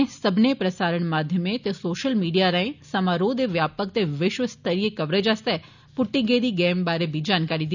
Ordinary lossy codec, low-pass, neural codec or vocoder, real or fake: none; 7.2 kHz; none; real